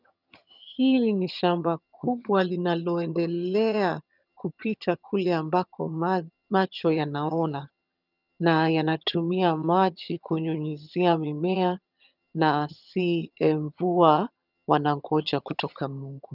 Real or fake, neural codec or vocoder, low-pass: fake; vocoder, 22.05 kHz, 80 mel bands, HiFi-GAN; 5.4 kHz